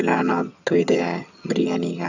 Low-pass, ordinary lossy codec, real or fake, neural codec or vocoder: 7.2 kHz; none; fake; vocoder, 22.05 kHz, 80 mel bands, HiFi-GAN